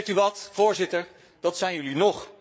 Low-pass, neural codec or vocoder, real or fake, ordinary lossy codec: none; codec, 16 kHz, 8 kbps, FreqCodec, larger model; fake; none